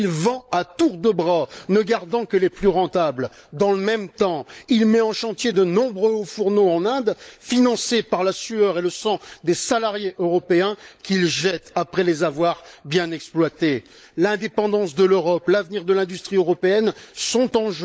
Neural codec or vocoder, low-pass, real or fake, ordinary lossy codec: codec, 16 kHz, 16 kbps, FunCodec, trained on LibriTTS, 50 frames a second; none; fake; none